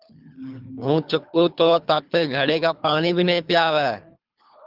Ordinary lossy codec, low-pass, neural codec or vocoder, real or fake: Opus, 24 kbps; 5.4 kHz; codec, 24 kHz, 3 kbps, HILCodec; fake